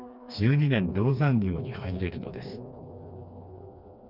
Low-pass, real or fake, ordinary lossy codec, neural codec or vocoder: 5.4 kHz; fake; none; codec, 16 kHz, 2 kbps, FreqCodec, smaller model